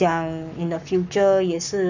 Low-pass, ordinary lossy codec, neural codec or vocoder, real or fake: 7.2 kHz; none; codec, 44.1 kHz, 7.8 kbps, Pupu-Codec; fake